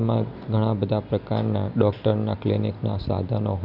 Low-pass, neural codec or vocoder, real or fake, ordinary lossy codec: 5.4 kHz; none; real; none